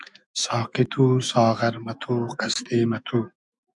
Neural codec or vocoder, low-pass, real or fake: autoencoder, 48 kHz, 128 numbers a frame, DAC-VAE, trained on Japanese speech; 10.8 kHz; fake